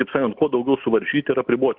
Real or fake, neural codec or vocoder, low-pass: real; none; 5.4 kHz